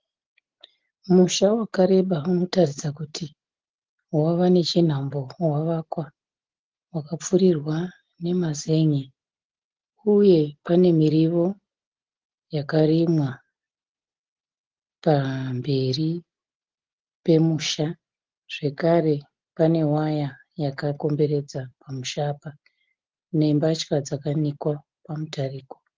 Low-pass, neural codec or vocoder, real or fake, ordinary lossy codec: 7.2 kHz; none; real; Opus, 16 kbps